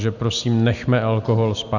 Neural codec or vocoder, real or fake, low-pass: none; real; 7.2 kHz